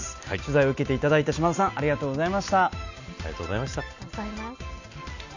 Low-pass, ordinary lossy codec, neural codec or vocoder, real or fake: 7.2 kHz; none; none; real